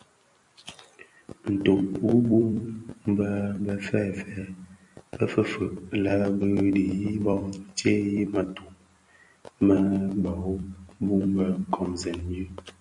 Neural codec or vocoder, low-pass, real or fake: vocoder, 44.1 kHz, 128 mel bands every 256 samples, BigVGAN v2; 10.8 kHz; fake